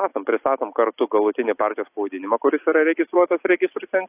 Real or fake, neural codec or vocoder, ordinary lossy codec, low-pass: real; none; AAC, 24 kbps; 3.6 kHz